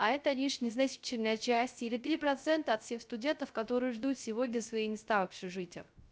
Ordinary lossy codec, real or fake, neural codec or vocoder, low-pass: none; fake; codec, 16 kHz, 0.3 kbps, FocalCodec; none